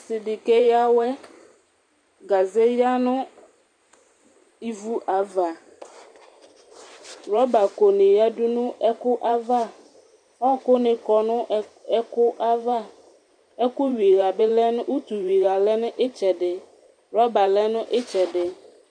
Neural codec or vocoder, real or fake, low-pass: vocoder, 44.1 kHz, 128 mel bands every 512 samples, BigVGAN v2; fake; 9.9 kHz